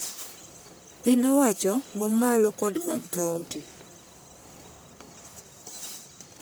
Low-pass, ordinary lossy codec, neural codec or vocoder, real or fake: none; none; codec, 44.1 kHz, 1.7 kbps, Pupu-Codec; fake